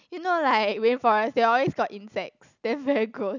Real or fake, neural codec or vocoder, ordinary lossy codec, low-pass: real; none; none; 7.2 kHz